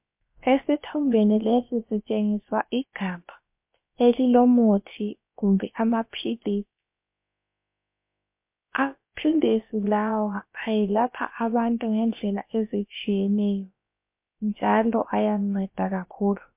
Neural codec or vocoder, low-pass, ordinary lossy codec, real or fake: codec, 16 kHz, about 1 kbps, DyCAST, with the encoder's durations; 3.6 kHz; MP3, 32 kbps; fake